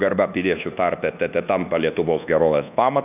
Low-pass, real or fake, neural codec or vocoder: 3.6 kHz; fake; codec, 24 kHz, 1.2 kbps, DualCodec